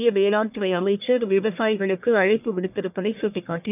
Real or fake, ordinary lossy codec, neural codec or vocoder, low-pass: fake; none; codec, 44.1 kHz, 1.7 kbps, Pupu-Codec; 3.6 kHz